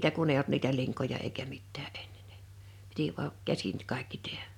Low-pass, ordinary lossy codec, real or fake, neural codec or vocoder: 19.8 kHz; none; real; none